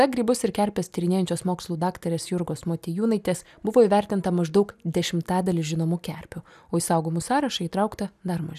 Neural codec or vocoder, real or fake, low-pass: none; real; 14.4 kHz